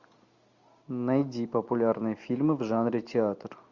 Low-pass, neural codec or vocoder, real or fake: 7.2 kHz; none; real